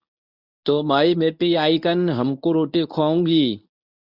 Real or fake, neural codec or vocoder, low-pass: fake; codec, 24 kHz, 0.9 kbps, WavTokenizer, medium speech release version 1; 5.4 kHz